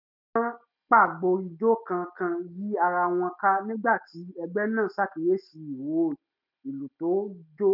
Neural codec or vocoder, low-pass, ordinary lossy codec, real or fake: none; 5.4 kHz; none; real